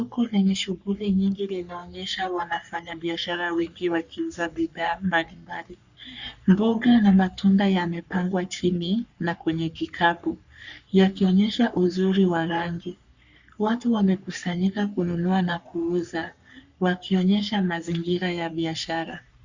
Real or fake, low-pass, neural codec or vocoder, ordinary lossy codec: fake; 7.2 kHz; codec, 44.1 kHz, 3.4 kbps, Pupu-Codec; Opus, 64 kbps